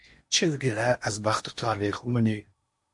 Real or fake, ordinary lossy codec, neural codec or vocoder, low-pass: fake; MP3, 48 kbps; codec, 16 kHz in and 24 kHz out, 0.8 kbps, FocalCodec, streaming, 65536 codes; 10.8 kHz